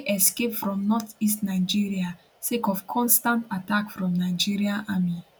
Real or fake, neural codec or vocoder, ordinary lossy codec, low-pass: real; none; none; none